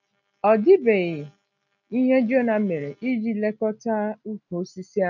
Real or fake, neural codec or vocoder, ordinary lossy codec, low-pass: real; none; none; 7.2 kHz